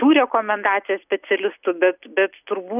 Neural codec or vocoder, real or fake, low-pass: none; real; 3.6 kHz